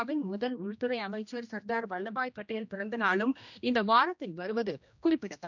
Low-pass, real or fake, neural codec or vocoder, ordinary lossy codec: 7.2 kHz; fake; codec, 16 kHz, 1 kbps, X-Codec, HuBERT features, trained on general audio; none